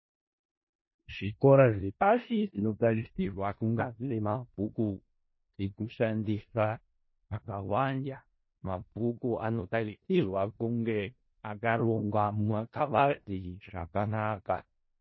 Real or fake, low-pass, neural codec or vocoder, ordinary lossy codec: fake; 7.2 kHz; codec, 16 kHz in and 24 kHz out, 0.4 kbps, LongCat-Audio-Codec, four codebook decoder; MP3, 24 kbps